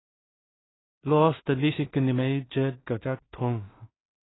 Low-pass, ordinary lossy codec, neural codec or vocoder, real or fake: 7.2 kHz; AAC, 16 kbps; codec, 16 kHz in and 24 kHz out, 0.4 kbps, LongCat-Audio-Codec, two codebook decoder; fake